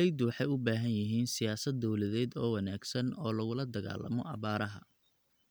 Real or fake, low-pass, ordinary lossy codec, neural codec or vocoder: real; none; none; none